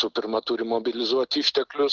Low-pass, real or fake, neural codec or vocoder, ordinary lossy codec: 7.2 kHz; real; none; Opus, 16 kbps